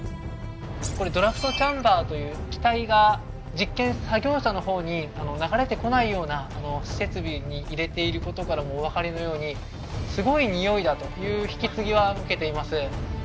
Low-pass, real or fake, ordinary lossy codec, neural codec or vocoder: none; real; none; none